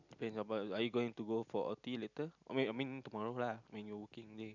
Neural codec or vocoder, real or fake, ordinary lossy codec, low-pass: none; real; none; 7.2 kHz